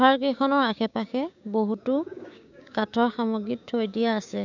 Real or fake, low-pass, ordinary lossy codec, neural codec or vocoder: fake; 7.2 kHz; none; codec, 16 kHz, 6 kbps, DAC